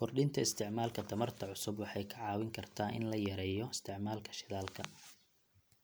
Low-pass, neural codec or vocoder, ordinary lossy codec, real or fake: none; none; none; real